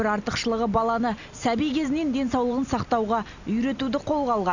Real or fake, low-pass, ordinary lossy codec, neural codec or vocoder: real; 7.2 kHz; none; none